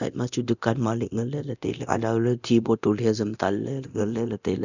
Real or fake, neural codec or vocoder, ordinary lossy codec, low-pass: fake; codec, 24 kHz, 0.9 kbps, DualCodec; none; 7.2 kHz